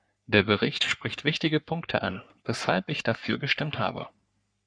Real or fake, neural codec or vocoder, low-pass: fake; codec, 44.1 kHz, 3.4 kbps, Pupu-Codec; 9.9 kHz